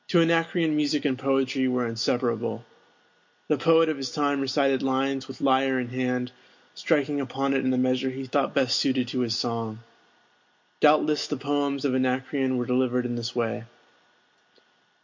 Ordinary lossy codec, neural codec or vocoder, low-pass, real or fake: MP3, 48 kbps; none; 7.2 kHz; real